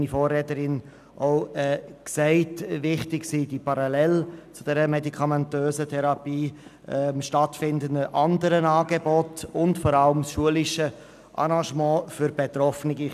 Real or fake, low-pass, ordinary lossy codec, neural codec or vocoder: real; 14.4 kHz; none; none